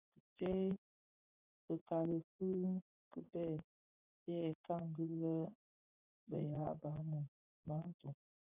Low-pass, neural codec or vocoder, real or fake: 3.6 kHz; vocoder, 24 kHz, 100 mel bands, Vocos; fake